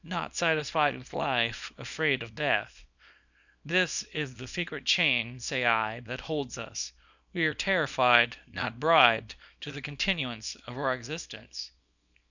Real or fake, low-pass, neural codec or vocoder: fake; 7.2 kHz; codec, 24 kHz, 0.9 kbps, WavTokenizer, small release